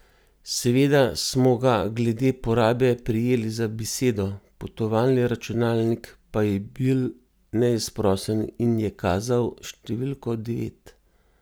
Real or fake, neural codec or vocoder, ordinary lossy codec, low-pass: real; none; none; none